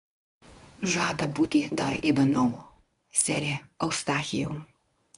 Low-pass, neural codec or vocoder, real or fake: 10.8 kHz; codec, 24 kHz, 0.9 kbps, WavTokenizer, medium speech release version 1; fake